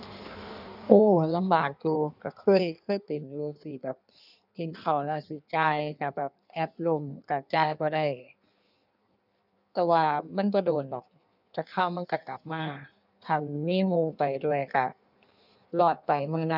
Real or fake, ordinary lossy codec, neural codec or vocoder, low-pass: fake; none; codec, 16 kHz in and 24 kHz out, 1.1 kbps, FireRedTTS-2 codec; 5.4 kHz